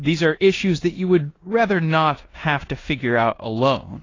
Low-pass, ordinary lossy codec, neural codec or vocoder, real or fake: 7.2 kHz; AAC, 32 kbps; codec, 16 kHz in and 24 kHz out, 0.9 kbps, LongCat-Audio-Codec, four codebook decoder; fake